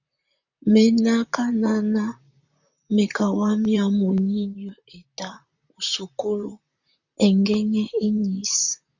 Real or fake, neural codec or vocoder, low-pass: fake; vocoder, 44.1 kHz, 128 mel bands, Pupu-Vocoder; 7.2 kHz